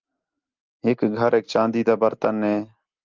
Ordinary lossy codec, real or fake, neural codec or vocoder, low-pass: Opus, 24 kbps; real; none; 7.2 kHz